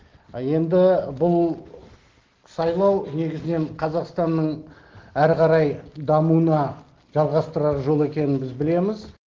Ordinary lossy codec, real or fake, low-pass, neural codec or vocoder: Opus, 16 kbps; real; 7.2 kHz; none